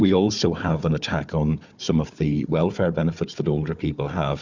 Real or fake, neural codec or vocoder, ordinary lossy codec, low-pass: fake; codec, 16 kHz, 4 kbps, FunCodec, trained on Chinese and English, 50 frames a second; Opus, 64 kbps; 7.2 kHz